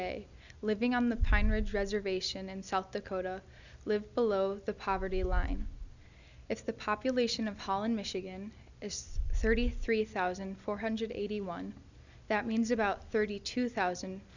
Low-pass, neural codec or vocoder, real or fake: 7.2 kHz; none; real